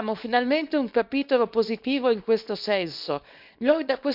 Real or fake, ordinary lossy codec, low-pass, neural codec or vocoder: fake; none; 5.4 kHz; codec, 24 kHz, 0.9 kbps, WavTokenizer, small release